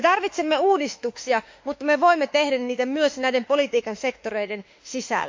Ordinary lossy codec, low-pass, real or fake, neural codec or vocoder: MP3, 48 kbps; 7.2 kHz; fake; autoencoder, 48 kHz, 32 numbers a frame, DAC-VAE, trained on Japanese speech